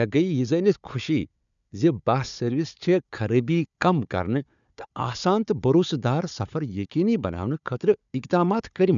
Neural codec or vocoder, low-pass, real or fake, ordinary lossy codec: codec, 16 kHz, 4 kbps, FunCodec, trained on Chinese and English, 50 frames a second; 7.2 kHz; fake; MP3, 96 kbps